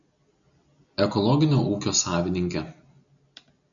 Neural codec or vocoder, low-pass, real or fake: none; 7.2 kHz; real